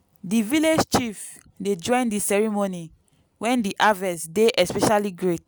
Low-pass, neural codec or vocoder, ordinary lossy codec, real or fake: none; none; none; real